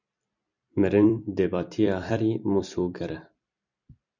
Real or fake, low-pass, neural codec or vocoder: fake; 7.2 kHz; vocoder, 44.1 kHz, 128 mel bands every 256 samples, BigVGAN v2